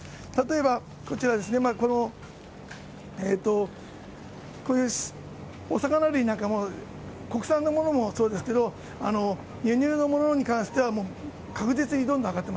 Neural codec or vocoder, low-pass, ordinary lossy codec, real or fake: none; none; none; real